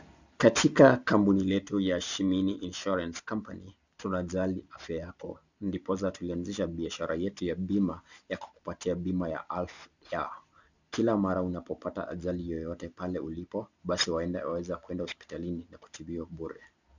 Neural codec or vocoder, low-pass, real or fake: none; 7.2 kHz; real